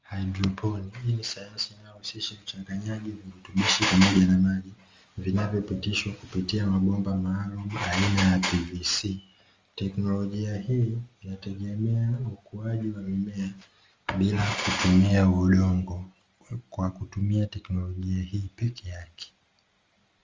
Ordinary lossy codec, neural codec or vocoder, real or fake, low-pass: Opus, 32 kbps; none; real; 7.2 kHz